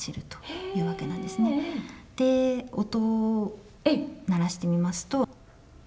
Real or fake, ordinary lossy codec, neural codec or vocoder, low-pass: real; none; none; none